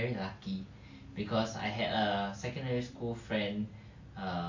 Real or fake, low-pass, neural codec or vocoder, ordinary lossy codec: real; 7.2 kHz; none; AAC, 48 kbps